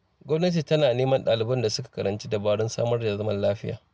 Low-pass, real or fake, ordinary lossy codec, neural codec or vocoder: none; real; none; none